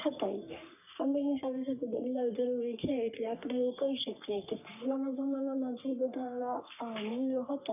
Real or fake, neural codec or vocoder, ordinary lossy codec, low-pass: fake; codec, 44.1 kHz, 3.4 kbps, Pupu-Codec; none; 3.6 kHz